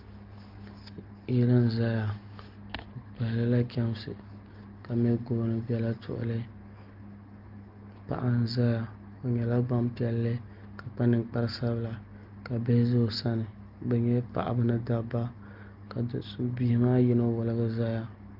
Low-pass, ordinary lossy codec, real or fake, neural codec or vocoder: 5.4 kHz; Opus, 32 kbps; real; none